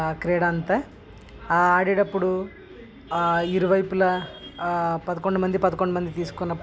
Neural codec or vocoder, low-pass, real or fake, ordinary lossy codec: none; none; real; none